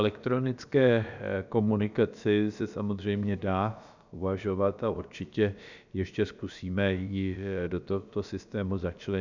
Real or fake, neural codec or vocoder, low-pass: fake; codec, 16 kHz, about 1 kbps, DyCAST, with the encoder's durations; 7.2 kHz